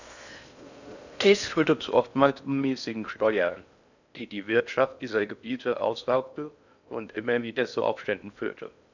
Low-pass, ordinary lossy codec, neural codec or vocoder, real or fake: 7.2 kHz; none; codec, 16 kHz in and 24 kHz out, 0.6 kbps, FocalCodec, streaming, 4096 codes; fake